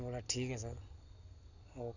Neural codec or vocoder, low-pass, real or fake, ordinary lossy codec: none; 7.2 kHz; real; none